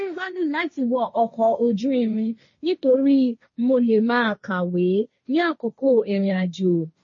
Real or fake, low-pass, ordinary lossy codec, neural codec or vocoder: fake; 7.2 kHz; MP3, 32 kbps; codec, 16 kHz, 1.1 kbps, Voila-Tokenizer